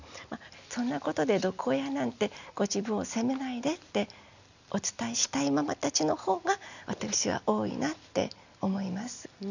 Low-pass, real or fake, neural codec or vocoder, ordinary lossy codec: 7.2 kHz; real; none; none